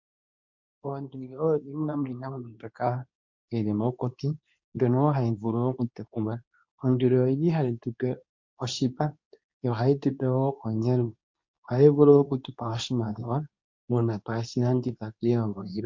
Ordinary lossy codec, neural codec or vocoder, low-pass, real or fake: MP3, 48 kbps; codec, 24 kHz, 0.9 kbps, WavTokenizer, medium speech release version 2; 7.2 kHz; fake